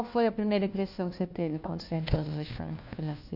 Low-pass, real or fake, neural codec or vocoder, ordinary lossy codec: 5.4 kHz; fake; codec, 16 kHz, 1 kbps, FunCodec, trained on LibriTTS, 50 frames a second; AAC, 48 kbps